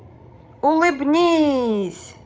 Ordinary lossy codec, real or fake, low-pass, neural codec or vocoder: none; fake; none; codec, 16 kHz, 16 kbps, FreqCodec, larger model